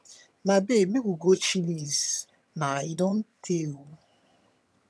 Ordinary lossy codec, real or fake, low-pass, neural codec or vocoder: none; fake; none; vocoder, 22.05 kHz, 80 mel bands, HiFi-GAN